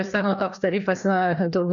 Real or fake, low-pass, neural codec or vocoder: fake; 7.2 kHz; codec, 16 kHz, 2 kbps, FreqCodec, larger model